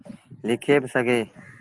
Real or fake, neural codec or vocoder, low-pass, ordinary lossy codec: real; none; 10.8 kHz; Opus, 24 kbps